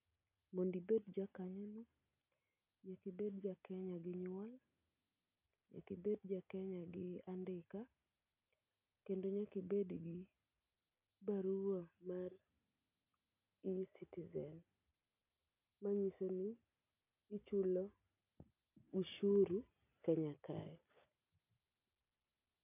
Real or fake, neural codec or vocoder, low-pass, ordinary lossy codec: real; none; 3.6 kHz; none